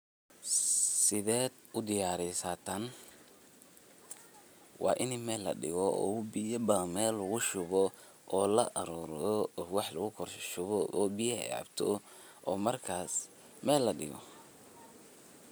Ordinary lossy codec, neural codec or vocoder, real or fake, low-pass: none; none; real; none